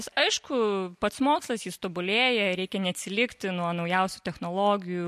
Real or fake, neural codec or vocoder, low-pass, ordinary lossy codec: real; none; 14.4 kHz; MP3, 64 kbps